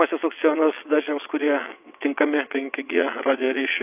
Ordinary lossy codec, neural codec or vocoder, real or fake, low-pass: AAC, 32 kbps; vocoder, 22.05 kHz, 80 mel bands, WaveNeXt; fake; 3.6 kHz